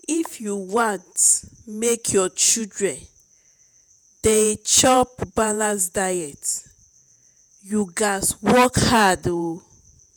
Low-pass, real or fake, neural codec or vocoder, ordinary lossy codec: none; fake; vocoder, 48 kHz, 128 mel bands, Vocos; none